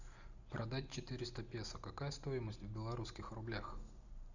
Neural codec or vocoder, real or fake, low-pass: none; real; 7.2 kHz